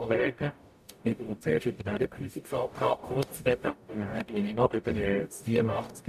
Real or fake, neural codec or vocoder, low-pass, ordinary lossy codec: fake; codec, 44.1 kHz, 0.9 kbps, DAC; 14.4 kHz; none